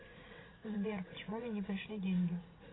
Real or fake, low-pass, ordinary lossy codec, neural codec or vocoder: fake; 7.2 kHz; AAC, 16 kbps; vocoder, 44.1 kHz, 80 mel bands, Vocos